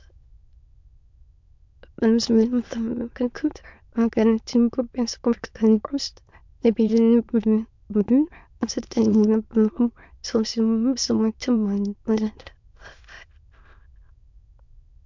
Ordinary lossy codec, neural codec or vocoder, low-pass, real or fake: MP3, 64 kbps; autoencoder, 22.05 kHz, a latent of 192 numbers a frame, VITS, trained on many speakers; 7.2 kHz; fake